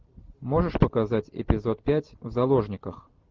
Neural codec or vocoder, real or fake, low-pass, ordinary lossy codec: none; real; 7.2 kHz; Opus, 32 kbps